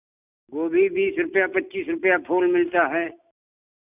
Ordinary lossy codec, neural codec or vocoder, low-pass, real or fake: none; none; 3.6 kHz; real